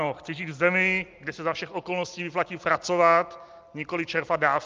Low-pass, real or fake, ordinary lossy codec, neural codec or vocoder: 7.2 kHz; real; Opus, 24 kbps; none